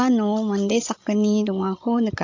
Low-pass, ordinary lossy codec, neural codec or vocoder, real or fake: 7.2 kHz; AAC, 48 kbps; codec, 16 kHz, 16 kbps, FreqCodec, larger model; fake